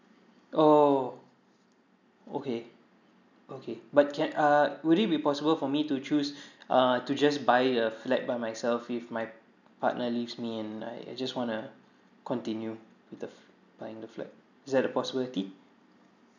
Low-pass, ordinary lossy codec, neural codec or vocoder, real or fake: 7.2 kHz; none; none; real